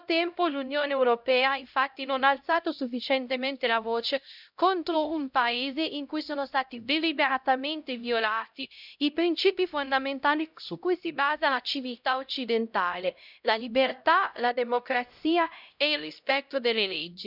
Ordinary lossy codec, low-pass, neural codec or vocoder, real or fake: none; 5.4 kHz; codec, 16 kHz, 0.5 kbps, X-Codec, HuBERT features, trained on LibriSpeech; fake